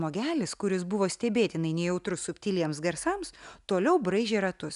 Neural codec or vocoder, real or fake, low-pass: none; real; 10.8 kHz